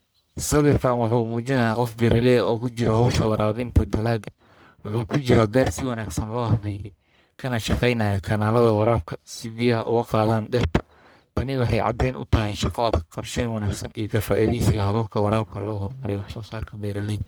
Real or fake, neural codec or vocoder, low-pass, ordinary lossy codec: fake; codec, 44.1 kHz, 1.7 kbps, Pupu-Codec; none; none